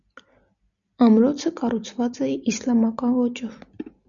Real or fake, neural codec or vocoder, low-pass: real; none; 7.2 kHz